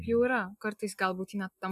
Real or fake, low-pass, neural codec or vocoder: real; 14.4 kHz; none